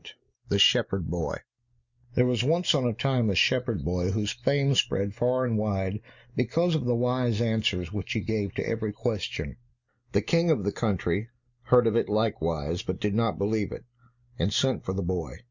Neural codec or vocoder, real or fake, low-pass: none; real; 7.2 kHz